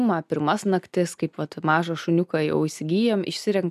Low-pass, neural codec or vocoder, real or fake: 14.4 kHz; none; real